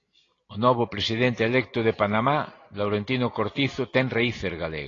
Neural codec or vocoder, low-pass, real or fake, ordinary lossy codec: none; 7.2 kHz; real; AAC, 32 kbps